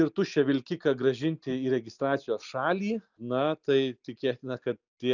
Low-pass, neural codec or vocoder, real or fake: 7.2 kHz; none; real